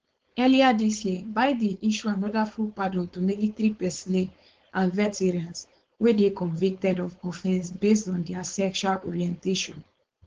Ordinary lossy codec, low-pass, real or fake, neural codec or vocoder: Opus, 16 kbps; 7.2 kHz; fake; codec, 16 kHz, 4.8 kbps, FACodec